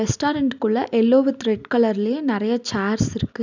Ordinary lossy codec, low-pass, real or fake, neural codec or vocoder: none; 7.2 kHz; real; none